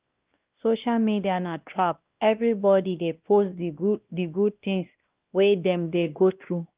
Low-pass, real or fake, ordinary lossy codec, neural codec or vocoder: 3.6 kHz; fake; Opus, 32 kbps; codec, 16 kHz, 1 kbps, X-Codec, WavLM features, trained on Multilingual LibriSpeech